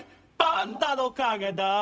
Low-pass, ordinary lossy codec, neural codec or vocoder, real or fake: none; none; codec, 16 kHz, 0.4 kbps, LongCat-Audio-Codec; fake